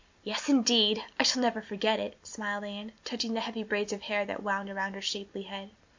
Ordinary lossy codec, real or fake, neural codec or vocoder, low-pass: MP3, 48 kbps; real; none; 7.2 kHz